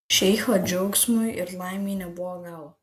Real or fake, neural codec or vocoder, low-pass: real; none; 19.8 kHz